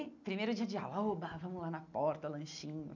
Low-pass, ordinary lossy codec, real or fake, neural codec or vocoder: 7.2 kHz; none; real; none